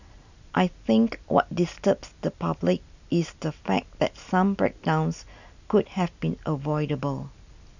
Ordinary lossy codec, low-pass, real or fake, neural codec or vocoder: none; 7.2 kHz; real; none